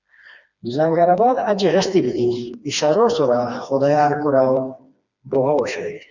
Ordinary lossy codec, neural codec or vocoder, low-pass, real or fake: Opus, 64 kbps; codec, 16 kHz, 2 kbps, FreqCodec, smaller model; 7.2 kHz; fake